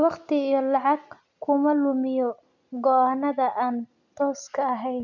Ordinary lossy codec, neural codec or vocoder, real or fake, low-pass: none; none; real; 7.2 kHz